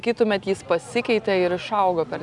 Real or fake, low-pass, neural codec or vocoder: real; 10.8 kHz; none